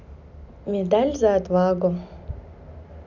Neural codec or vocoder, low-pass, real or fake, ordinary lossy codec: none; 7.2 kHz; real; none